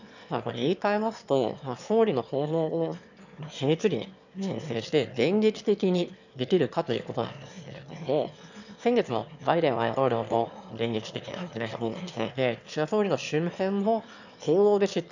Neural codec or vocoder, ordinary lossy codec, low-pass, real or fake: autoencoder, 22.05 kHz, a latent of 192 numbers a frame, VITS, trained on one speaker; none; 7.2 kHz; fake